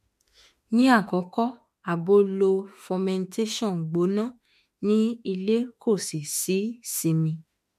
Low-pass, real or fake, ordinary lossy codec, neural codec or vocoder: 14.4 kHz; fake; MP3, 64 kbps; autoencoder, 48 kHz, 32 numbers a frame, DAC-VAE, trained on Japanese speech